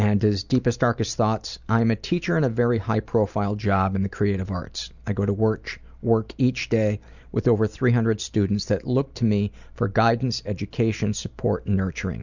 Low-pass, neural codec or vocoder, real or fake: 7.2 kHz; none; real